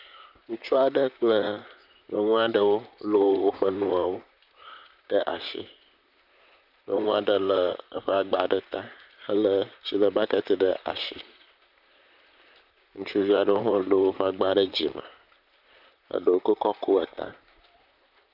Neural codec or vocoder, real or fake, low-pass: vocoder, 44.1 kHz, 128 mel bands, Pupu-Vocoder; fake; 5.4 kHz